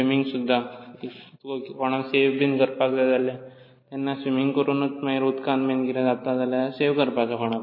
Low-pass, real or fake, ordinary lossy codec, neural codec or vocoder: 5.4 kHz; real; MP3, 24 kbps; none